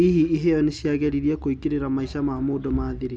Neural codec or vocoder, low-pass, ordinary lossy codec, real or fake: none; 9.9 kHz; none; real